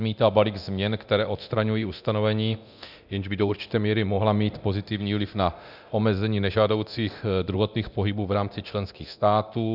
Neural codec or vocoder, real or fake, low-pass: codec, 24 kHz, 0.9 kbps, DualCodec; fake; 5.4 kHz